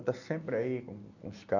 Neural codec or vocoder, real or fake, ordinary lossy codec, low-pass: none; real; none; 7.2 kHz